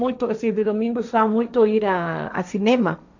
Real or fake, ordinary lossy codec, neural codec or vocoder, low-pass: fake; none; codec, 16 kHz, 1.1 kbps, Voila-Tokenizer; 7.2 kHz